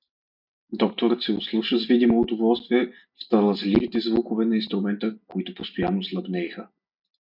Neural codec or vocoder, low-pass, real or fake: none; 5.4 kHz; real